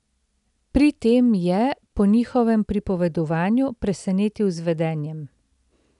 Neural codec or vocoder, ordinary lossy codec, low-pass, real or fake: none; none; 10.8 kHz; real